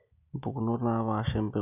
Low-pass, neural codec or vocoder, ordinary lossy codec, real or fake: 3.6 kHz; none; none; real